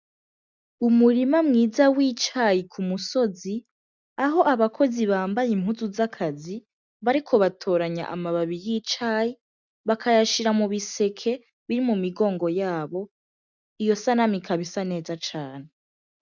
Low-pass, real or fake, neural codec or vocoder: 7.2 kHz; real; none